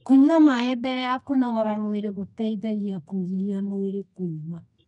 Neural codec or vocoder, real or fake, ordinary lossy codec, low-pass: codec, 24 kHz, 0.9 kbps, WavTokenizer, medium music audio release; fake; none; 10.8 kHz